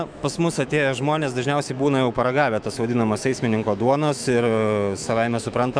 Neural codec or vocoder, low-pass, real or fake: autoencoder, 48 kHz, 128 numbers a frame, DAC-VAE, trained on Japanese speech; 9.9 kHz; fake